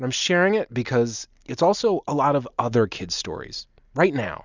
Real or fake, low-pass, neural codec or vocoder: real; 7.2 kHz; none